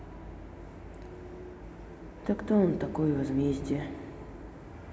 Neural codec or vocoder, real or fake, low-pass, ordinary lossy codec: none; real; none; none